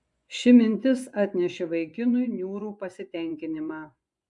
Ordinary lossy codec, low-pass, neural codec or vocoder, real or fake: MP3, 96 kbps; 10.8 kHz; vocoder, 24 kHz, 100 mel bands, Vocos; fake